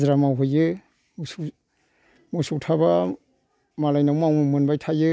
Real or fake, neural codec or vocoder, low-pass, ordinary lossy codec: real; none; none; none